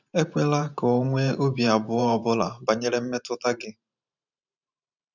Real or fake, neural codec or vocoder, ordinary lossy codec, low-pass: fake; vocoder, 44.1 kHz, 128 mel bands every 512 samples, BigVGAN v2; none; 7.2 kHz